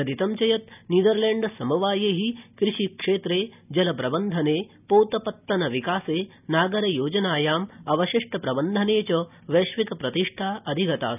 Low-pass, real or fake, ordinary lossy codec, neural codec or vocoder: 3.6 kHz; real; none; none